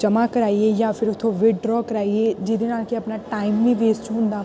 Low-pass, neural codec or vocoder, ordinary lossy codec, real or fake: none; none; none; real